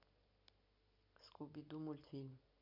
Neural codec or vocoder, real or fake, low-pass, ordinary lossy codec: none; real; 5.4 kHz; none